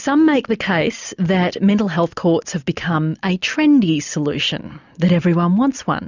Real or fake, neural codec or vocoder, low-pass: real; none; 7.2 kHz